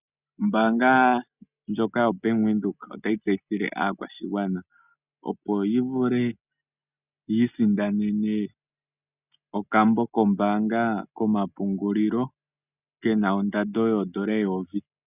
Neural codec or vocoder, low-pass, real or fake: none; 3.6 kHz; real